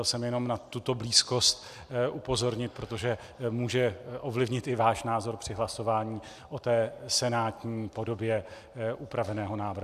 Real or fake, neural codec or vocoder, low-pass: fake; vocoder, 44.1 kHz, 128 mel bands every 256 samples, BigVGAN v2; 14.4 kHz